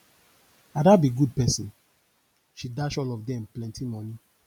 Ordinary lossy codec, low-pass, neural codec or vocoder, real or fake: none; 19.8 kHz; none; real